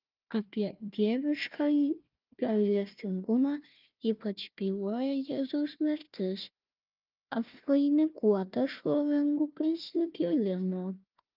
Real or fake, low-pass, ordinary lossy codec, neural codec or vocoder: fake; 5.4 kHz; Opus, 24 kbps; codec, 16 kHz, 1 kbps, FunCodec, trained on Chinese and English, 50 frames a second